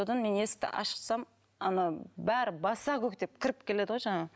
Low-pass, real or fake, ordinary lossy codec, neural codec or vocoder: none; real; none; none